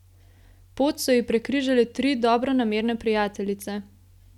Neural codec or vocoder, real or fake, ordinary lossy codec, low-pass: none; real; none; 19.8 kHz